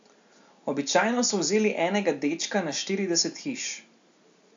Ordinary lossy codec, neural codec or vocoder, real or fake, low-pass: AAC, 64 kbps; none; real; 7.2 kHz